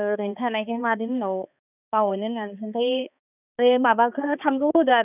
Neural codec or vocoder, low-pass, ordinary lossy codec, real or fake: codec, 16 kHz, 2 kbps, X-Codec, HuBERT features, trained on balanced general audio; 3.6 kHz; none; fake